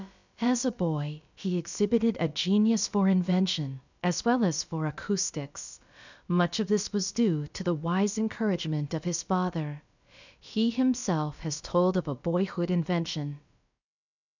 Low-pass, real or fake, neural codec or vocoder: 7.2 kHz; fake; codec, 16 kHz, about 1 kbps, DyCAST, with the encoder's durations